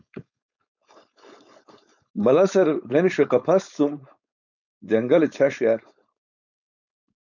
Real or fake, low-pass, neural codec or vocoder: fake; 7.2 kHz; codec, 16 kHz, 4.8 kbps, FACodec